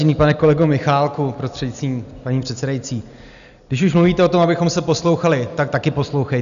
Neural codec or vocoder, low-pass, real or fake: none; 7.2 kHz; real